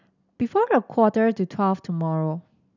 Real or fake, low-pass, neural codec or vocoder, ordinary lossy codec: real; 7.2 kHz; none; none